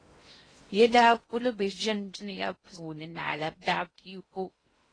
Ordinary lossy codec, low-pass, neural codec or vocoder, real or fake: AAC, 32 kbps; 9.9 kHz; codec, 16 kHz in and 24 kHz out, 0.6 kbps, FocalCodec, streaming, 2048 codes; fake